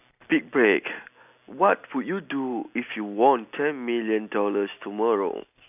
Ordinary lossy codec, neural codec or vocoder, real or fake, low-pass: none; none; real; 3.6 kHz